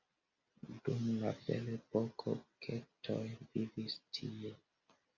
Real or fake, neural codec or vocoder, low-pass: real; none; 7.2 kHz